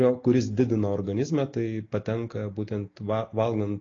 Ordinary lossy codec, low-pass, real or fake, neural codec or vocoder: AAC, 32 kbps; 7.2 kHz; real; none